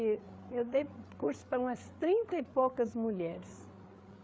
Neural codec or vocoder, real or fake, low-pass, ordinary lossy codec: codec, 16 kHz, 8 kbps, FreqCodec, larger model; fake; none; none